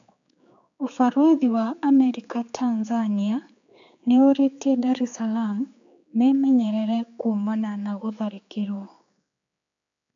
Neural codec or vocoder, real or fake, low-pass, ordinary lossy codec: codec, 16 kHz, 4 kbps, X-Codec, HuBERT features, trained on general audio; fake; 7.2 kHz; AAC, 64 kbps